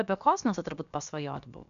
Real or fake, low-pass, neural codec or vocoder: fake; 7.2 kHz; codec, 16 kHz, about 1 kbps, DyCAST, with the encoder's durations